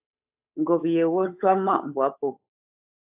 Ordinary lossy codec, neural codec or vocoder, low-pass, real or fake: AAC, 32 kbps; codec, 16 kHz, 8 kbps, FunCodec, trained on Chinese and English, 25 frames a second; 3.6 kHz; fake